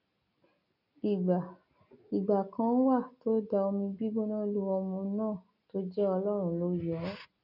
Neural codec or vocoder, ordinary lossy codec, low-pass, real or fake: none; none; 5.4 kHz; real